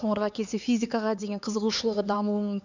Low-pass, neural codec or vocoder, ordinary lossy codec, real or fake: 7.2 kHz; codec, 16 kHz, 4 kbps, X-Codec, HuBERT features, trained on LibriSpeech; none; fake